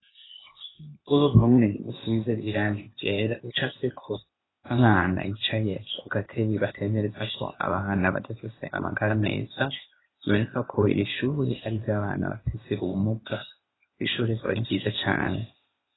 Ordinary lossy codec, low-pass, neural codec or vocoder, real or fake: AAC, 16 kbps; 7.2 kHz; codec, 16 kHz, 0.8 kbps, ZipCodec; fake